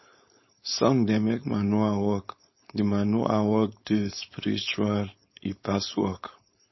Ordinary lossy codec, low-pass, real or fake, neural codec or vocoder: MP3, 24 kbps; 7.2 kHz; fake; codec, 16 kHz, 4.8 kbps, FACodec